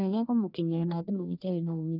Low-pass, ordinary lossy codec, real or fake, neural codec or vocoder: 5.4 kHz; none; fake; codec, 44.1 kHz, 1.7 kbps, Pupu-Codec